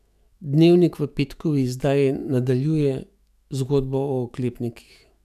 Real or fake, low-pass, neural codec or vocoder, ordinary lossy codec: fake; 14.4 kHz; autoencoder, 48 kHz, 128 numbers a frame, DAC-VAE, trained on Japanese speech; none